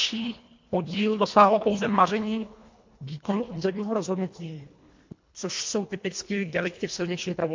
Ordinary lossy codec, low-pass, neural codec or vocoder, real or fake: MP3, 48 kbps; 7.2 kHz; codec, 24 kHz, 1.5 kbps, HILCodec; fake